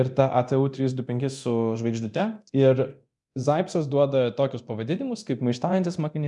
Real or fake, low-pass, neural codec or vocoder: fake; 10.8 kHz; codec, 24 kHz, 0.9 kbps, DualCodec